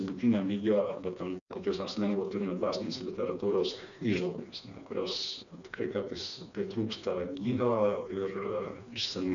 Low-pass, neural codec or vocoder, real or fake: 7.2 kHz; codec, 16 kHz, 2 kbps, FreqCodec, smaller model; fake